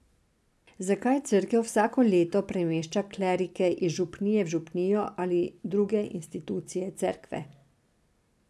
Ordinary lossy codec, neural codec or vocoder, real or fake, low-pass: none; none; real; none